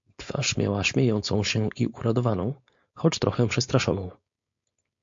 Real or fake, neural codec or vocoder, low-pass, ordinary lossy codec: fake; codec, 16 kHz, 4.8 kbps, FACodec; 7.2 kHz; MP3, 48 kbps